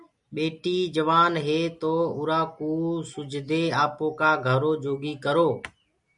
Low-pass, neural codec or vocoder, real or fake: 10.8 kHz; none; real